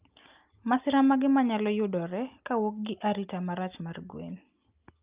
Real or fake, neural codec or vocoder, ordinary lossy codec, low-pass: real; none; Opus, 24 kbps; 3.6 kHz